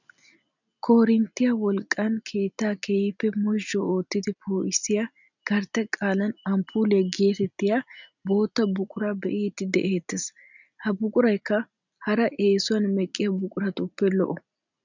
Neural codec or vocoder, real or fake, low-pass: none; real; 7.2 kHz